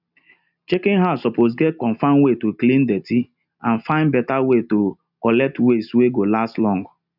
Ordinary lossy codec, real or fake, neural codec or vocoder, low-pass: none; real; none; 5.4 kHz